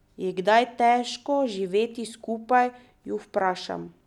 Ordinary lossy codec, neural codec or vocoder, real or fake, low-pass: none; none; real; 19.8 kHz